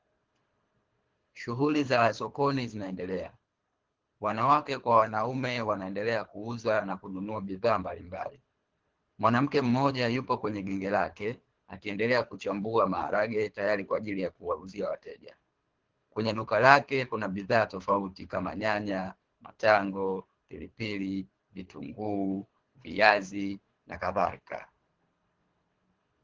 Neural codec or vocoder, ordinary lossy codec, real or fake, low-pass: codec, 24 kHz, 3 kbps, HILCodec; Opus, 16 kbps; fake; 7.2 kHz